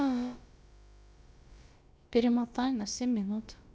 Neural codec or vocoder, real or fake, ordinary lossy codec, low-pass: codec, 16 kHz, about 1 kbps, DyCAST, with the encoder's durations; fake; none; none